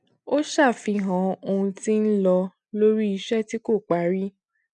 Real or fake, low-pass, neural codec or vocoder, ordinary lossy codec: real; 10.8 kHz; none; none